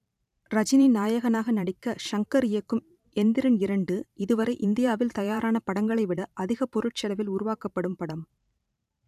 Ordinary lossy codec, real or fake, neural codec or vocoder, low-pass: none; fake; vocoder, 44.1 kHz, 128 mel bands every 512 samples, BigVGAN v2; 14.4 kHz